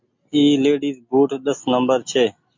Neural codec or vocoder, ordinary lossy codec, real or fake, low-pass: none; MP3, 48 kbps; real; 7.2 kHz